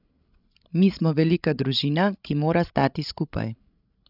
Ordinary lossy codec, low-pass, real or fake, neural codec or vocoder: none; 5.4 kHz; fake; codec, 16 kHz, 16 kbps, FreqCodec, larger model